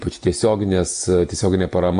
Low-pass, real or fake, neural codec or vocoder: 9.9 kHz; real; none